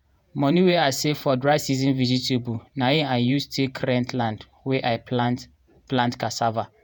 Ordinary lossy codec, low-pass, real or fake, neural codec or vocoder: none; none; fake; vocoder, 48 kHz, 128 mel bands, Vocos